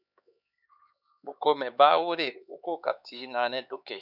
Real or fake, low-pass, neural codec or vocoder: fake; 5.4 kHz; codec, 16 kHz, 4 kbps, X-Codec, HuBERT features, trained on LibriSpeech